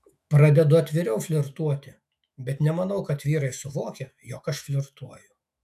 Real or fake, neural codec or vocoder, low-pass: fake; autoencoder, 48 kHz, 128 numbers a frame, DAC-VAE, trained on Japanese speech; 14.4 kHz